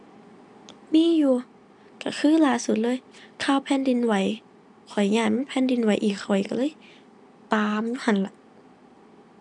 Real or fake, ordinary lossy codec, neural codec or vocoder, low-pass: real; none; none; 10.8 kHz